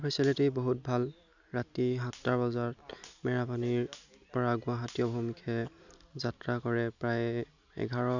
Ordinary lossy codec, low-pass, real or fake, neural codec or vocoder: none; 7.2 kHz; real; none